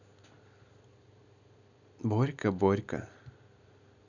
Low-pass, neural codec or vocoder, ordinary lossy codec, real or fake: 7.2 kHz; none; none; real